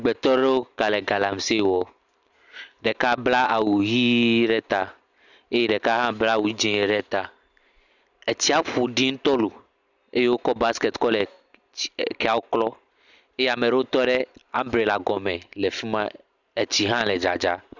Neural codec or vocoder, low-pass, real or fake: none; 7.2 kHz; real